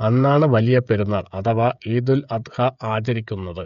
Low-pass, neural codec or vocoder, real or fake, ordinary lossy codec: 7.2 kHz; codec, 16 kHz, 16 kbps, FreqCodec, smaller model; fake; none